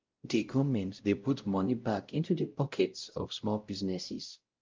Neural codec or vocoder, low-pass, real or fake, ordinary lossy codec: codec, 16 kHz, 0.5 kbps, X-Codec, WavLM features, trained on Multilingual LibriSpeech; 7.2 kHz; fake; Opus, 24 kbps